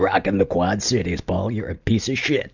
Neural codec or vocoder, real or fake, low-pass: none; real; 7.2 kHz